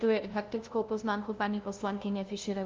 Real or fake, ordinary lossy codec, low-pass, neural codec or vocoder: fake; Opus, 24 kbps; 7.2 kHz; codec, 16 kHz, 0.5 kbps, FunCodec, trained on LibriTTS, 25 frames a second